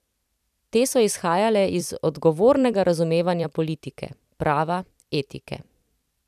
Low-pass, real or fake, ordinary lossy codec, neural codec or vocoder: 14.4 kHz; fake; none; vocoder, 44.1 kHz, 128 mel bands every 256 samples, BigVGAN v2